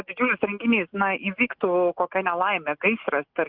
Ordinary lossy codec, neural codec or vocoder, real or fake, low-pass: Opus, 24 kbps; none; real; 5.4 kHz